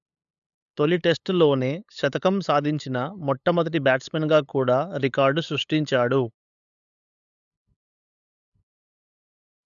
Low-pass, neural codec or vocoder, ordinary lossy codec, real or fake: 7.2 kHz; codec, 16 kHz, 8 kbps, FunCodec, trained on LibriTTS, 25 frames a second; MP3, 96 kbps; fake